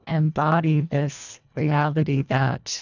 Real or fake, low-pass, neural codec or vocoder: fake; 7.2 kHz; codec, 24 kHz, 1.5 kbps, HILCodec